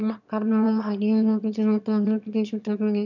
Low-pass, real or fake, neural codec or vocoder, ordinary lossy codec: 7.2 kHz; fake; autoencoder, 22.05 kHz, a latent of 192 numbers a frame, VITS, trained on one speaker; none